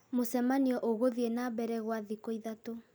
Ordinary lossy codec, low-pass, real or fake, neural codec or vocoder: none; none; real; none